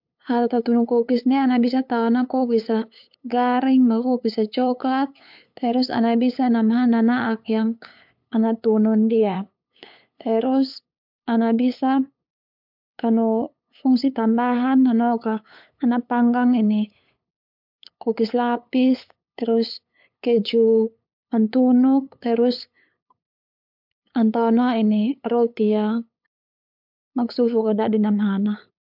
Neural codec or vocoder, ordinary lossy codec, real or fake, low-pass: codec, 16 kHz, 8 kbps, FunCodec, trained on LibriTTS, 25 frames a second; MP3, 48 kbps; fake; 5.4 kHz